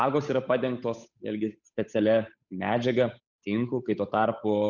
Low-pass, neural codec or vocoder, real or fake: 7.2 kHz; codec, 16 kHz, 8 kbps, FunCodec, trained on Chinese and English, 25 frames a second; fake